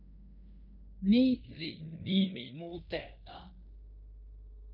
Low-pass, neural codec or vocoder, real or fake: 5.4 kHz; codec, 16 kHz in and 24 kHz out, 0.9 kbps, LongCat-Audio-Codec, fine tuned four codebook decoder; fake